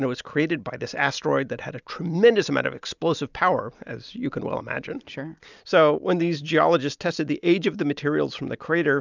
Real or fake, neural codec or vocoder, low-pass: real; none; 7.2 kHz